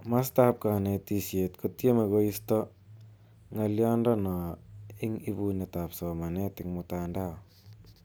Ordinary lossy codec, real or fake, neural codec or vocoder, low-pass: none; real; none; none